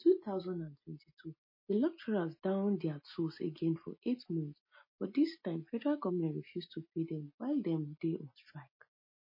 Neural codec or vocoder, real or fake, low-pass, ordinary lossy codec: none; real; 5.4 kHz; MP3, 24 kbps